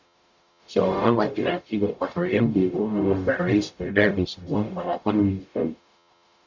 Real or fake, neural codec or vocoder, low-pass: fake; codec, 44.1 kHz, 0.9 kbps, DAC; 7.2 kHz